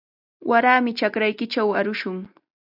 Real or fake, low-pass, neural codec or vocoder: real; 5.4 kHz; none